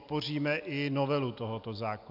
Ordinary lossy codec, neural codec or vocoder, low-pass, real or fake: AAC, 48 kbps; vocoder, 44.1 kHz, 128 mel bands every 256 samples, BigVGAN v2; 5.4 kHz; fake